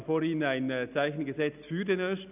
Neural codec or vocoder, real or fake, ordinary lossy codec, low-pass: none; real; none; 3.6 kHz